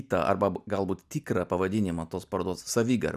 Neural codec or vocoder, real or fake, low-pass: none; real; 14.4 kHz